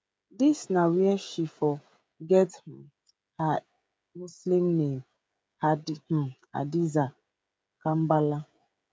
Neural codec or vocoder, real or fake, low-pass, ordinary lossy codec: codec, 16 kHz, 16 kbps, FreqCodec, smaller model; fake; none; none